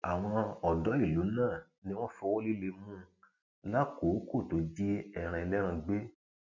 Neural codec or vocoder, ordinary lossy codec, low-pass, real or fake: none; none; 7.2 kHz; real